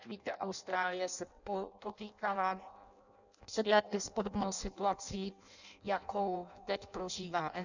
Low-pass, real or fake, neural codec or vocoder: 7.2 kHz; fake; codec, 16 kHz in and 24 kHz out, 0.6 kbps, FireRedTTS-2 codec